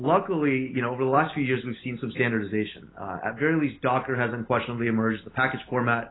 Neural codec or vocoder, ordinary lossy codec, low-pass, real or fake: none; AAC, 16 kbps; 7.2 kHz; real